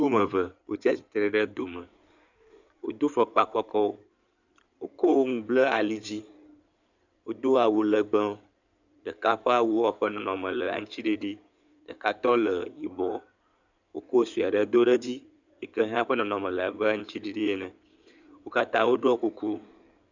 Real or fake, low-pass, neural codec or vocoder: fake; 7.2 kHz; codec, 16 kHz in and 24 kHz out, 2.2 kbps, FireRedTTS-2 codec